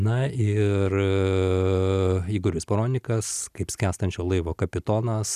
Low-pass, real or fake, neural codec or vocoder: 14.4 kHz; real; none